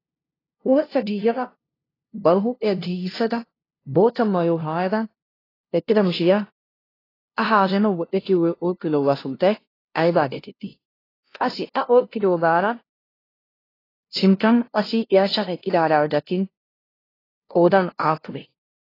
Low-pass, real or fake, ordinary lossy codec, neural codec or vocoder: 5.4 kHz; fake; AAC, 24 kbps; codec, 16 kHz, 0.5 kbps, FunCodec, trained on LibriTTS, 25 frames a second